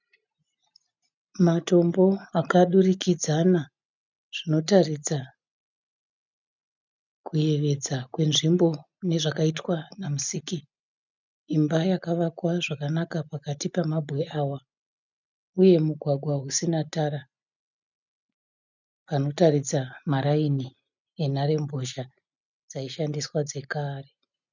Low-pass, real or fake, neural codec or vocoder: 7.2 kHz; real; none